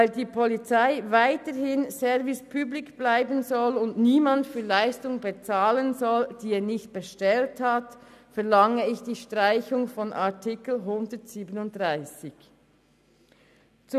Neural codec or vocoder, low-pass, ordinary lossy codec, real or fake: none; 14.4 kHz; none; real